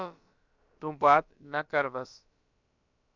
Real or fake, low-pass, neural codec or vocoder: fake; 7.2 kHz; codec, 16 kHz, about 1 kbps, DyCAST, with the encoder's durations